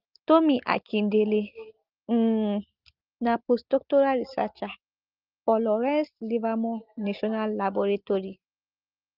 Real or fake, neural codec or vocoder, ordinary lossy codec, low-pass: real; none; Opus, 32 kbps; 5.4 kHz